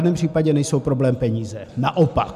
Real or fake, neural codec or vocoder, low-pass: real; none; 14.4 kHz